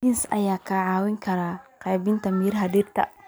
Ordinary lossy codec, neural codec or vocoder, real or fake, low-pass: none; none; real; none